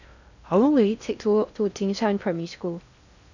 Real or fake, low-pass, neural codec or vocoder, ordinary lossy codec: fake; 7.2 kHz; codec, 16 kHz in and 24 kHz out, 0.6 kbps, FocalCodec, streaming, 2048 codes; none